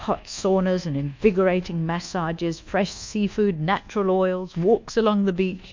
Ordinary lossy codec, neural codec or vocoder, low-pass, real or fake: MP3, 64 kbps; codec, 24 kHz, 1.2 kbps, DualCodec; 7.2 kHz; fake